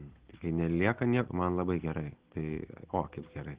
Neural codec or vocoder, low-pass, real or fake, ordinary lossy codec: none; 3.6 kHz; real; Opus, 16 kbps